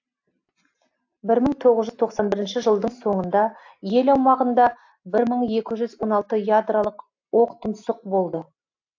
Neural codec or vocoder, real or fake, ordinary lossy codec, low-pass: none; real; AAC, 48 kbps; 7.2 kHz